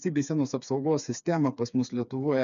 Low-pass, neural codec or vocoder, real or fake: 7.2 kHz; codec, 16 kHz, 4 kbps, FreqCodec, smaller model; fake